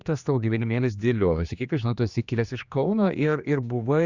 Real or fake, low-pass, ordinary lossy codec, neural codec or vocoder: fake; 7.2 kHz; Opus, 64 kbps; codec, 16 kHz, 2 kbps, X-Codec, HuBERT features, trained on general audio